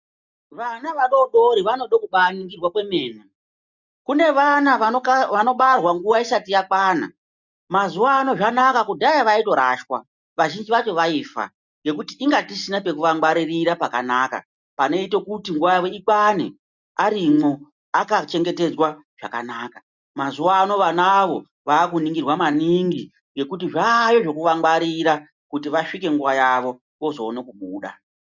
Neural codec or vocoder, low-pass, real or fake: none; 7.2 kHz; real